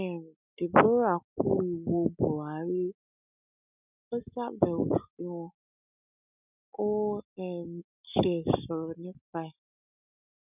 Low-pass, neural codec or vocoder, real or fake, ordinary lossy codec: 3.6 kHz; none; real; none